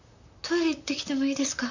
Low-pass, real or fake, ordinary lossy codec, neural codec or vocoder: 7.2 kHz; fake; none; vocoder, 44.1 kHz, 128 mel bands, Pupu-Vocoder